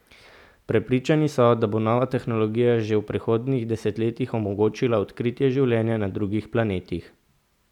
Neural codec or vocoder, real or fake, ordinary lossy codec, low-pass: none; real; none; 19.8 kHz